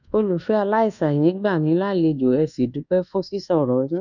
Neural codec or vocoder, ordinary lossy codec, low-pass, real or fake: codec, 24 kHz, 1.2 kbps, DualCodec; none; 7.2 kHz; fake